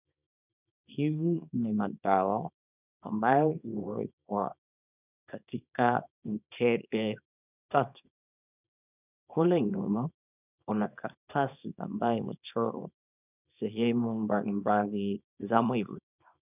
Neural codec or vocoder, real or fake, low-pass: codec, 24 kHz, 0.9 kbps, WavTokenizer, small release; fake; 3.6 kHz